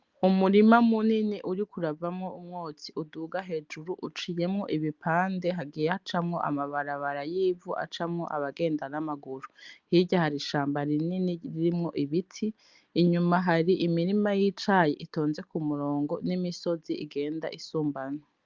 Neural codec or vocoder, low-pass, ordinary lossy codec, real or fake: none; 7.2 kHz; Opus, 32 kbps; real